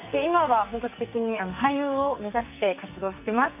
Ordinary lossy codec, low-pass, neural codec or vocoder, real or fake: none; 3.6 kHz; codec, 44.1 kHz, 2.6 kbps, SNAC; fake